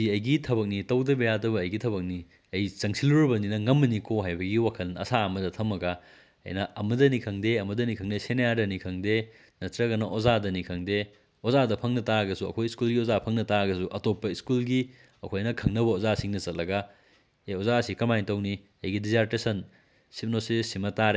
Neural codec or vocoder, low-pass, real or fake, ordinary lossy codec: none; none; real; none